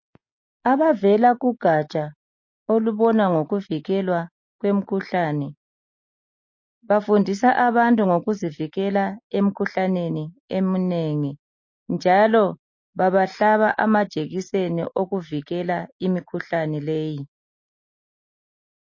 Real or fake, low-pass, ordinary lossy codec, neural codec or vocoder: real; 7.2 kHz; MP3, 32 kbps; none